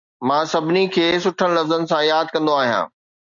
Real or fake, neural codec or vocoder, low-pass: real; none; 7.2 kHz